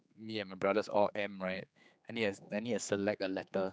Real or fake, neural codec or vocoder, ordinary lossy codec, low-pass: fake; codec, 16 kHz, 4 kbps, X-Codec, HuBERT features, trained on general audio; none; none